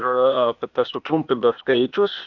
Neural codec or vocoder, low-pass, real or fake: codec, 16 kHz, 0.8 kbps, ZipCodec; 7.2 kHz; fake